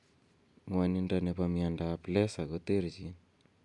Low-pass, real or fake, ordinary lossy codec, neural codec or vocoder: 10.8 kHz; real; none; none